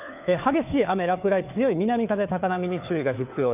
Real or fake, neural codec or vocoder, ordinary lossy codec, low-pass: fake; codec, 16 kHz, 4 kbps, FunCodec, trained on LibriTTS, 50 frames a second; none; 3.6 kHz